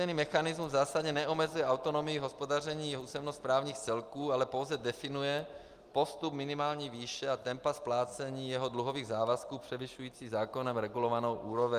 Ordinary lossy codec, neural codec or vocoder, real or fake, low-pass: Opus, 32 kbps; none; real; 14.4 kHz